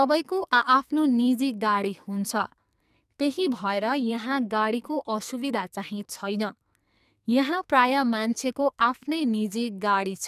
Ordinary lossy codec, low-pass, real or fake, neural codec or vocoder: none; 14.4 kHz; fake; codec, 44.1 kHz, 2.6 kbps, SNAC